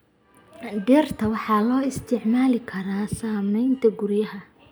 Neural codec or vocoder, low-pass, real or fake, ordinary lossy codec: none; none; real; none